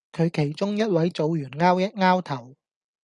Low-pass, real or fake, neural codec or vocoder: 10.8 kHz; real; none